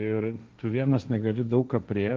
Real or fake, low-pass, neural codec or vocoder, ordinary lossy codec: fake; 7.2 kHz; codec, 16 kHz, 1.1 kbps, Voila-Tokenizer; Opus, 24 kbps